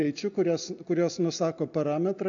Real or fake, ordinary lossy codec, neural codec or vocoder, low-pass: real; AAC, 64 kbps; none; 7.2 kHz